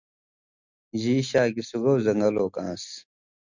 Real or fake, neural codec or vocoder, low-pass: real; none; 7.2 kHz